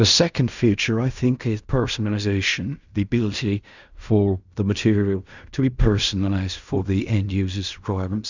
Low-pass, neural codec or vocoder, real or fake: 7.2 kHz; codec, 16 kHz in and 24 kHz out, 0.4 kbps, LongCat-Audio-Codec, fine tuned four codebook decoder; fake